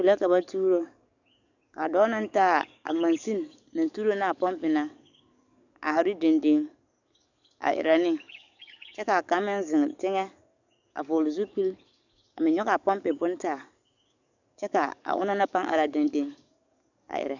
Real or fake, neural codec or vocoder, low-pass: fake; codec, 44.1 kHz, 7.8 kbps, DAC; 7.2 kHz